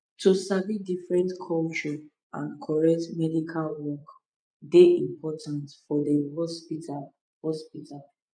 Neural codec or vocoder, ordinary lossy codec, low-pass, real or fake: vocoder, 24 kHz, 100 mel bands, Vocos; none; 9.9 kHz; fake